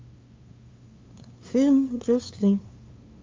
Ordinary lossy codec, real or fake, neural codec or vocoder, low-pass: Opus, 32 kbps; fake; codec, 16 kHz, 2 kbps, FunCodec, trained on LibriTTS, 25 frames a second; 7.2 kHz